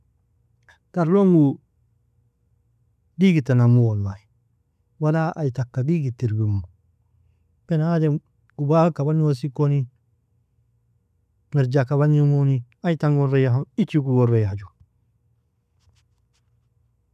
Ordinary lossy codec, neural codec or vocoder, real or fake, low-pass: none; none; real; 14.4 kHz